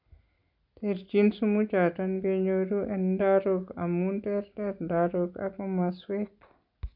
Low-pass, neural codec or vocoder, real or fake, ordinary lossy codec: 5.4 kHz; none; real; none